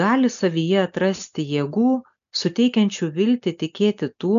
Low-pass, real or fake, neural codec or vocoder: 7.2 kHz; real; none